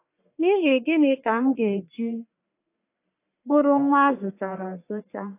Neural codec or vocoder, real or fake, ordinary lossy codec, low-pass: codec, 44.1 kHz, 1.7 kbps, Pupu-Codec; fake; AAC, 24 kbps; 3.6 kHz